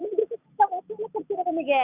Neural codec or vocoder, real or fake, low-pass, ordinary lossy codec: none; real; 3.6 kHz; none